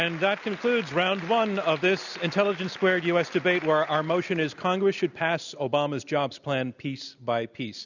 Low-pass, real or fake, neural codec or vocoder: 7.2 kHz; real; none